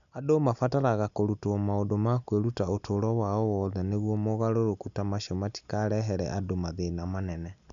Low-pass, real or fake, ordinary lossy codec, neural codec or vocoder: 7.2 kHz; real; none; none